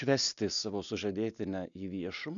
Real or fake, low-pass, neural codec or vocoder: real; 7.2 kHz; none